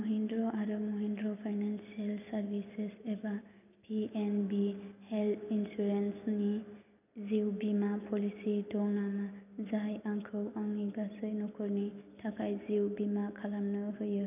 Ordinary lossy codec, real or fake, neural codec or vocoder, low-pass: none; real; none; 3.6 kHz